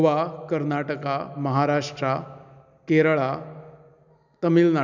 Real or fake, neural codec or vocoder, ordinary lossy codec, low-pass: real; none; none; 7.2 kHz